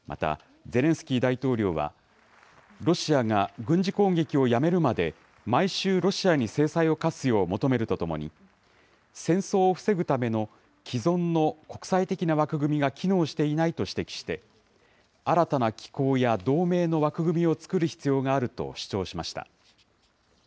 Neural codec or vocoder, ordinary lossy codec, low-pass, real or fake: none; none; none; real